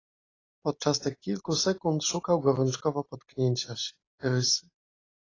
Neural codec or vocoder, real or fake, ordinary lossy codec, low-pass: none; real; AAC, 32 kbps; 7.2 kHz